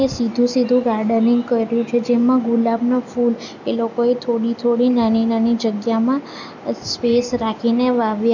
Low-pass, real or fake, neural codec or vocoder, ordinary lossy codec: 7.2 kHz; real; none; none